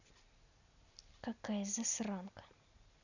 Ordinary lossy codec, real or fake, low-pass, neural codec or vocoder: none; real; 7.2 kHz; none